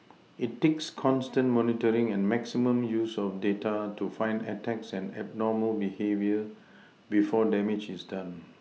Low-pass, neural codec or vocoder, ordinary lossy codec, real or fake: none; none; none; real